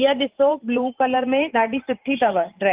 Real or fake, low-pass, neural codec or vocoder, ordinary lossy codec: fake; 3.6 kHz; vocoder, 44.1 kHz, 128 mel bands every 512 samples, BigVGAN v2; Opus, 32 kbps